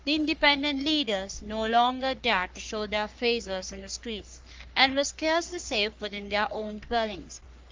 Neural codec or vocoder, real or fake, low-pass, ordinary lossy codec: codec, 44.1 kHz, 3.4 kbps, Pupu-Codec; fake; 7.2 kHz; Opus, 24 kbps